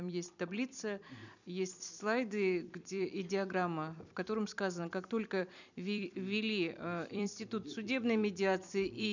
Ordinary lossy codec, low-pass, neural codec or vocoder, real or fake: none; 7.2 kHz; vocoder, 44.1 kHz, 128 mel bands every 256 samples, BigVGAN v2; fake